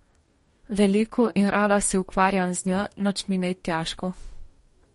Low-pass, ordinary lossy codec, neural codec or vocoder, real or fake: 19.8 kHz; MP3, 48 kbps; codec, 44.1 kHz, 2.6 kbps, DAC; fake